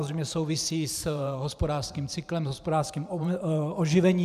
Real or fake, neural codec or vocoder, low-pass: fake; vocoder, 44.1 kHz, 128 mel bands every 512 samples, BigVGAN v2; 14.4 kHz